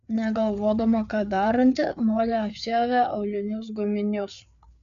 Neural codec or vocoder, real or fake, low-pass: codec, 16 kHz, 4 kbps, FreqCodec, larger model; fake; 7.2 kHz